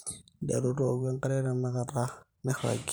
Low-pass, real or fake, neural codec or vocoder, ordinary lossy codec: none; fake; vocoder, 44.1 kHz, 128 mel bands every 512 samples, BigVGAN v2; none